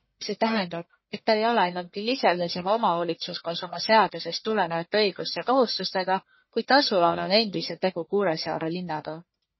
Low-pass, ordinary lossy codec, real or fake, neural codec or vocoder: 7.2 kHz; MP3, 24 kbps; fake; codec, 44.1 kHz, 1.7 kbps, Pupu-Codec